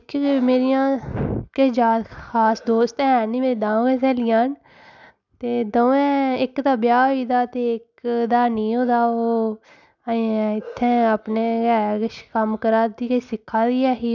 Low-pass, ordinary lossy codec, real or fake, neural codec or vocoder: 7.2 kHz; none; real; none